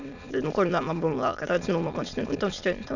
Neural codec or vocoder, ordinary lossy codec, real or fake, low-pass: autoencoder, 22.05 kHz, a latent of 192 numbers a frame, VITS, trained on many speakers; none; fake; 7.2 kHz